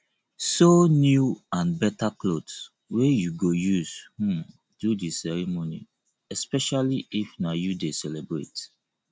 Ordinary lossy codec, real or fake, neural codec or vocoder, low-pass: none; real; none; none